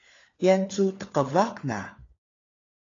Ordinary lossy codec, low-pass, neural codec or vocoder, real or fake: AAC, 32 kbps; 7.2 kHz; codec, 16 kHz, 4 kbps, FunCodec, trained on LibriTTS, 50 frames a second; fake